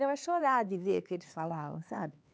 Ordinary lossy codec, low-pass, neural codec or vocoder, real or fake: none; none; codec, 16 kHz, 4 kbps, X-Codec, HuBERT features, trained on LibriSpeech; fake